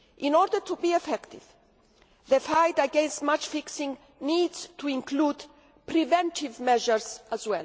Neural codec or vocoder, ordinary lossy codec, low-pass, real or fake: none; none; none; real